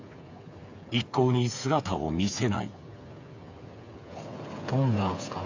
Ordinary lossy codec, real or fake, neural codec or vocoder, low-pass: AAC, 48 kbps; fake; codec, 16 kHz, 8 kbps, FreqCodec, smaller model; 7.2 kHz